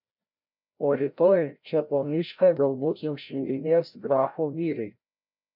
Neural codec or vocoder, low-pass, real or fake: codec, 16 kHz, 0.5 kbps, FreqCodec, larger model; 5.4 kHz; fake